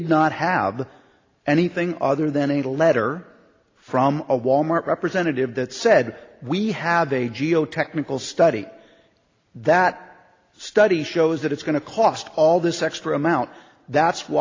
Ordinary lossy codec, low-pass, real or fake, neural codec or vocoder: AAC, 32 kbps; 7.2 kHz; real; none